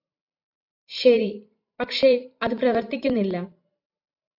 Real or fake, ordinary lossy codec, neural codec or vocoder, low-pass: fake; AAC, 48 kbps; vocoder, 44.1 kHz, 128 mel bands every 512 samples, BigVGAN v2; 5.4 kHz